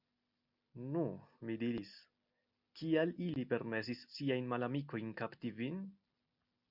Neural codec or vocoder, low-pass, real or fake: none; 5.4 kHz; real